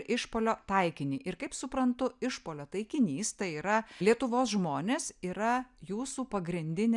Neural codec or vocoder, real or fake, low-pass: none; real; 10.8 kHz